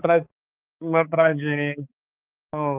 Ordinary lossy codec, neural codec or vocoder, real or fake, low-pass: Opus, 24 kbps; codec, 16 kHz, 4 kbps, X-Codec, HuBERT features, trained on balanced general audio; fake; 3.6 kHz